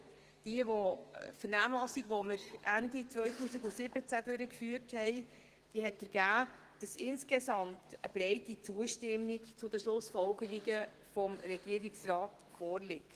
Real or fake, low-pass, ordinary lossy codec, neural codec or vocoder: fake; 14.4 kHz; Opus, 24 kbps; codec, 32 kHz, 1.9 kbps, SNAC